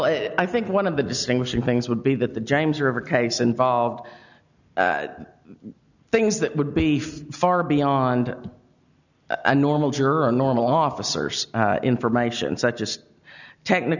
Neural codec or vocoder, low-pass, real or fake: none; 7.2 kHz; real